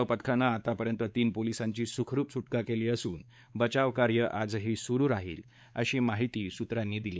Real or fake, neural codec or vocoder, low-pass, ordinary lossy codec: fake; codec, 16 kHz, 4 kbps, X-Codec, WavLM features, trained on Multilingual LibriSpeech; none; none